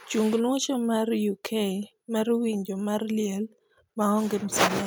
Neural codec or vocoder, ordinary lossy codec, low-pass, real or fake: none; none; none; real